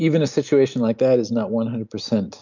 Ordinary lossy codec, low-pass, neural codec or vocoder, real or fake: MP3, 64 kbps; 7.2 kHz; none; real